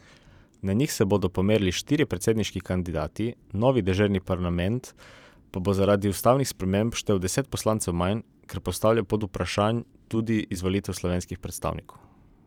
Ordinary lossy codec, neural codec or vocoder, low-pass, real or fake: none; vocoder, 44.1 kHz, 128 mel bands every 512 samples, BigVGAN v2; 19.8 kHz; fake